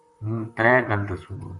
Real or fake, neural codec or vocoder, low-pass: fake; vocoder, 44.1 kHz, 128 mel bands, Pupu-Vocoder; 10.8 kHz